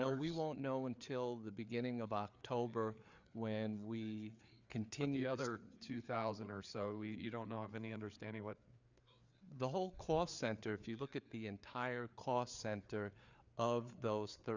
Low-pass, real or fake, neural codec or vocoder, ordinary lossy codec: 7.2 kHz; fake; codec, 16 kHz, 4 kbps, FreqCodec, larger model; Opus, 64 kbps